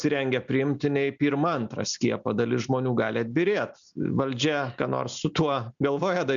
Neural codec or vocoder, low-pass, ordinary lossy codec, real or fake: none; 7.2 kHz; MP3, 96 kbps; real